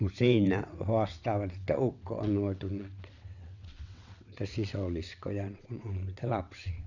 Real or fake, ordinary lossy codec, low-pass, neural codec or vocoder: fake; none; 7.2 kHz; vocoder, 22.05 kHz, 80 mel bands, WaveNeXt